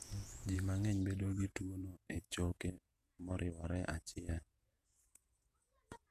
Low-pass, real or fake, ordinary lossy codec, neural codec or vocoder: 14.4 kHz; fake; none; vocoder, 48 kHz, 128 mel bands, Vocos